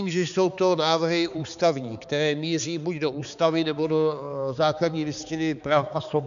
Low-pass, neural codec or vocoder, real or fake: 7.2 kHz; codec, 16 kHz, 4 kbps, X-Codec, HuBERT features, trained on balanced general audio; fake